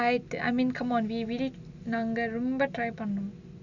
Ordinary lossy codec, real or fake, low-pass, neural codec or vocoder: none; real; 7.2 kHz; none